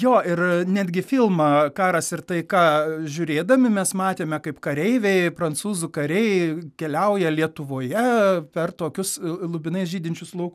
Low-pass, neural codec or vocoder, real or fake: 14.4 kHz; none; real